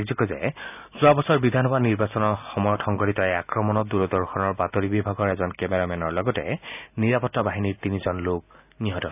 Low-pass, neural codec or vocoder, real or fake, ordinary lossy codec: 3.6 kHz; none; real; none